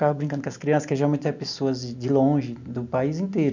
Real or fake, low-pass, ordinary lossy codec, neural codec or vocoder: real; 7.2 kHz; none; none